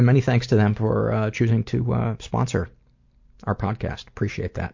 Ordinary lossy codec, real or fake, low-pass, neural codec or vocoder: MP3, 48 kbps; real; 7.2 kHz; none